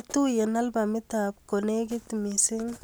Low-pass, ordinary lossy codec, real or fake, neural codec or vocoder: none; none; real; none